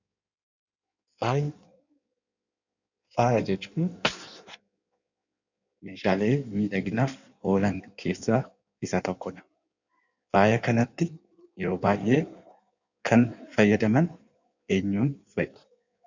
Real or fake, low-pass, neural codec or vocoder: fake; 7.2 kHz; codec, 16 kHz in and 24 kHz out, 1.1 kbps, FireRedTTS-2 codec